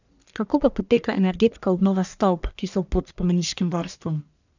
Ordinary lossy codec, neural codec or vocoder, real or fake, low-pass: none; codec, 44.1 kHz, 1.7 kbps, Pupu-Codec; fake; 7.2 kHz